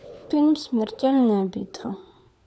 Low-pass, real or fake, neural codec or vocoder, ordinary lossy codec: none; fake; codec, 16 kHz, 4 kbps, FunCodec, trained on LibriTTS, 50 frames a second; none